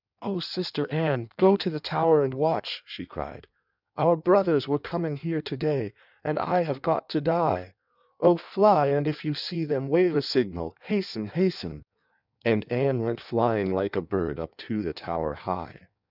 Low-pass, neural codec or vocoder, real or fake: 5.4 kHz; codec, 16 kHz in and 24 kHz out, 1.1 kbps, FireRedTTS-2 codec; fake